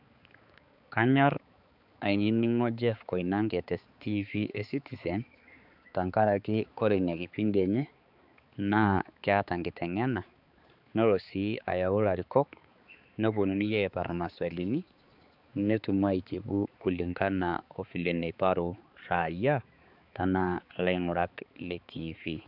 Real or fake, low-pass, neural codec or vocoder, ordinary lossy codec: fake; 5.4 kHz; codec, 16 kHz, 4 kbps, X-Codec, HuBERT features, trained on balanced general audio; none